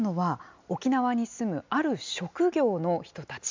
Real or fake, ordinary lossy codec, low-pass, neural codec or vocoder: real; MP3, 64 kbps; 7.2 kHz; none